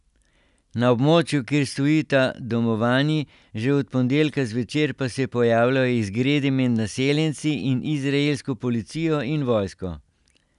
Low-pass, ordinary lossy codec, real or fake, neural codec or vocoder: 10.8 kHz; none; real; none